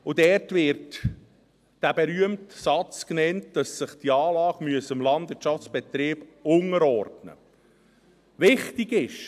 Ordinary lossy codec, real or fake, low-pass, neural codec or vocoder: AAC, 96 kbps; real; 14.4 kHz; none